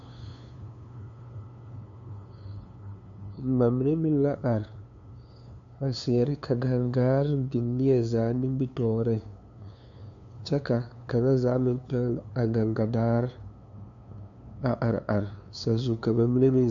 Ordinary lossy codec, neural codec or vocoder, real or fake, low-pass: MP3, 64 kbps; codec, 16 kHz, 2 kbps, FunCodec, trained on LibriTTS, 25 frames a second; fake; 7.2 kHz